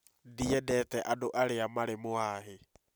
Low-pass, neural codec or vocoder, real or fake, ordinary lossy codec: none; none; real; none